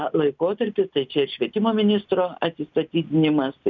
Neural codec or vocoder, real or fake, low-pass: none; real; 7.2 kHz